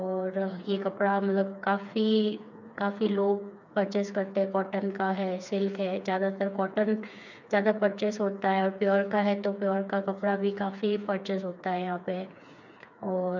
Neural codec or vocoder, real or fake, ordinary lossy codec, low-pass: codec, 16 kHz, 4 kbps, FreqCodec, smaller model; fake; none; 7.2 kHz